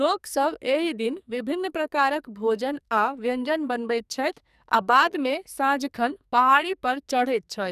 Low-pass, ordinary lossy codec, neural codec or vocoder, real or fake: 14.4 kHz; none; codec, 44.1 kHz, 2.6 kbps, SNAC; fake